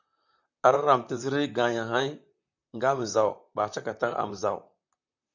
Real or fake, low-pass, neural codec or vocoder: fake; 7.2 kHz; vocoder, 44.1 kHz, 128 mel bands, Pupu-Vocoder